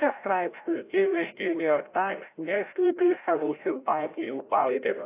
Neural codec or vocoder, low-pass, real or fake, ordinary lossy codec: codec, 16 kHz, 0.5 kbps, FreqCodec, larger model; 3.6 kHz; fake; AAC, 24 kbps